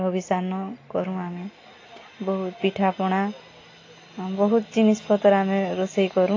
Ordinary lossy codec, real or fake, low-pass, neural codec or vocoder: AAC, 32 kbps; real; 7.2 kHz; none